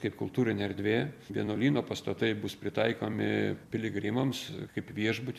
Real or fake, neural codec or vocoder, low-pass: fake; vocoder, 44.1 kHz, 128 mel bands every 256 samples, BigVGAN v2; 14.4 kHz